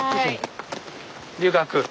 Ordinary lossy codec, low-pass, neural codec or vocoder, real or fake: none; none; none; real